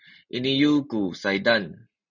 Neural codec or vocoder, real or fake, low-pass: none; real; 7.2 kHz